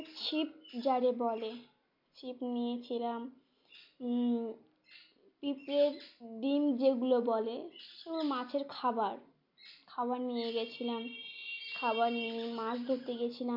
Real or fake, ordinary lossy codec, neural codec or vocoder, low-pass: real; MP3, 48 kbps; none; 5.4 kHz